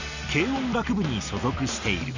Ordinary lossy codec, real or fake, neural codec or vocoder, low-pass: none; real; none; 7.2 kHz